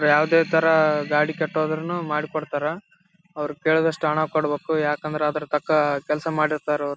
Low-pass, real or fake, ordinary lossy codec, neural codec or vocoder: none; real; none; none